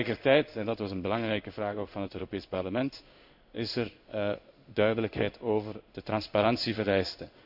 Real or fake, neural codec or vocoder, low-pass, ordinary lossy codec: fake; codec, 16 kHz in and 24 kHz out, 1 kbps, XY-Tokenizer; 5.4 kHz; none